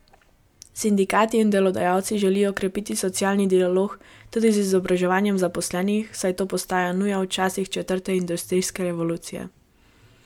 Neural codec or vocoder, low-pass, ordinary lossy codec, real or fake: none; 19.8 kHz; MP3, 96 kbps; real